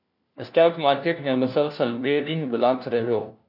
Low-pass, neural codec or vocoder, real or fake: 5.4 kHz; codec, 16 kHz, 1 kbps, FunCodec, trained on LibriTTS, 50 frames a second; fake